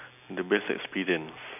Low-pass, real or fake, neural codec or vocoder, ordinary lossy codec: 3.6 kHz; real; none; none